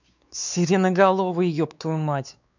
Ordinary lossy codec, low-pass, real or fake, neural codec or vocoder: none; 7.2 kHz; fake; codec, 16 kHz, 4 kbps, X-Codec, WavLM features, trained on Multilingual LibriSpeech